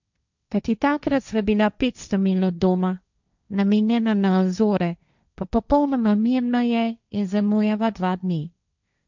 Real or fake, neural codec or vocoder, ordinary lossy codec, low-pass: fake; codec, 16 kHz, 1.1 kbps, Voila-Tokenizer; none; 7.2 kHz